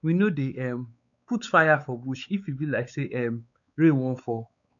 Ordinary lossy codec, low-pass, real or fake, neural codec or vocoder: none; 7.2 kHz; fake; codec, 16 kHz, 4 kbps, X-Codec, WavLM features, trained on Multilingual LibriSpeech